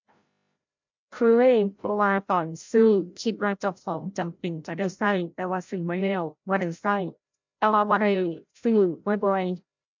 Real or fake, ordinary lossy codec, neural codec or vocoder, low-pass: fake; MP3, 64 kbps; codec, 16 kHz, 0.5 kbps, FreqCodec, larger model; 7.2 kHz